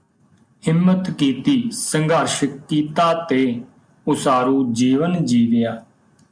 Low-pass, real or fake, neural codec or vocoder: 9.9 kHz; real; none